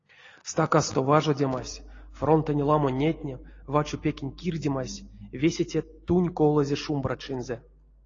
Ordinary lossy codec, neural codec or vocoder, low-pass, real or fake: AAC, 32 kbps; none; 7.2 kHz; real